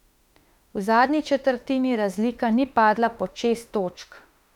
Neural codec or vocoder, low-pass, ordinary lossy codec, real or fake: autoencoder, 48 kHz, 32 numbers a frame, DAC-VAE, trained on Japanese speech; 19.8 kHz; none; fake